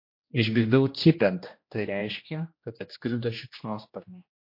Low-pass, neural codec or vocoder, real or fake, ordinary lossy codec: 5.4 kHz; codec, 16 kHz, 1 kbps, X-Codec, HuBERT features, trained on general audio; fake; MP3, 32 kbps